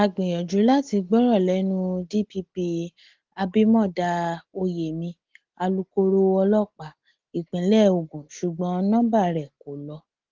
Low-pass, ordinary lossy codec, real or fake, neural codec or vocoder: 7.2 kHz; Opus, 16 kbps; real; none